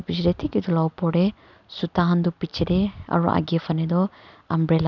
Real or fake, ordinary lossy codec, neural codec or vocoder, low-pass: real; none; none; 7.2 kHz